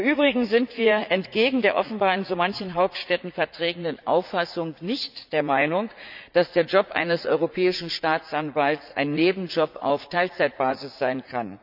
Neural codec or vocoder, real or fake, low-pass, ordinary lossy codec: vocoder, 44.1 kHz, 80 mel bands, Vocos; fake; 5.4 kHz; none